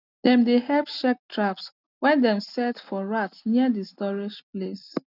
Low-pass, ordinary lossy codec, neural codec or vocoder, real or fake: 5.4 kHz; none; none; real